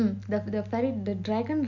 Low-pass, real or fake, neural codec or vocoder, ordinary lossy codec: 7.2 kHz; real; none; none